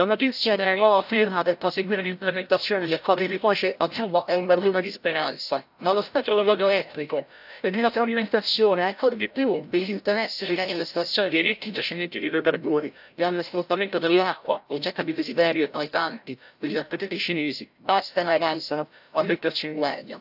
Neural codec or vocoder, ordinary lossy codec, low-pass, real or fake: codec, 16 kHz, 0.5 kbps, FreqCodec, larger model; AAC, 48 kbps; 5.4 kHz; fake